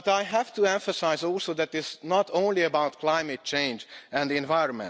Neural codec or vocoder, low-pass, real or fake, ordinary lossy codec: none; none; real; none